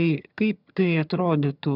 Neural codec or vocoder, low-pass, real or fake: vocoder, 22.05 kHz, 80 mel bands, HiFi-GAN; 5.4 kHz; fake